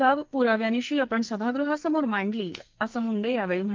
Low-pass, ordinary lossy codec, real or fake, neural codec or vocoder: 7.2 kHz; Opus, 24 kbps; fake; codec, 44.1 kHz, 2.6 kbps, SNAC